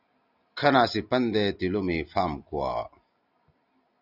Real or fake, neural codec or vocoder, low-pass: real; none; 5.4 kHz